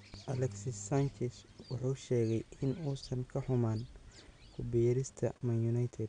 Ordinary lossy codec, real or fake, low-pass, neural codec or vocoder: none; real; 9.9 kHz; none